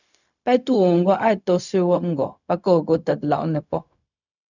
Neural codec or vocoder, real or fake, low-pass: codec, 16 kHz, 0.4 kbps, LongCat-Audio-Codec; fake; 7.2 kHz